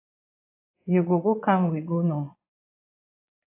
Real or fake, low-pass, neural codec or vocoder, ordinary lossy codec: fake; 3.6 kHz; vocoder, 22.05 kHz, 80 mel bands, Vocos; AAC, 24 kbps